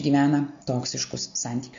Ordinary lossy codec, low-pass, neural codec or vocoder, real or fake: AAC, 64 kbps; 7.2 kHz; none; real